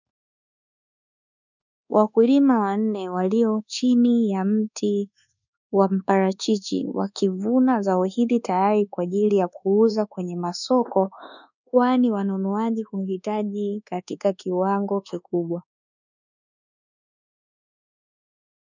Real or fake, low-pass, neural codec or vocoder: fake; 7.2 kHz; codec, 24 kHz, 1.2 kbps, DualCodec